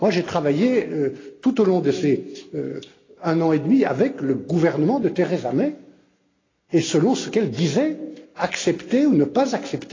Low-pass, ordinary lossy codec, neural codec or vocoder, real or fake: 7.2 kHz; AAC, 32 kbps; none; real